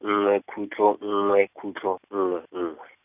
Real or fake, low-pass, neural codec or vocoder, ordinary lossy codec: real; 3.6 kHz; none; none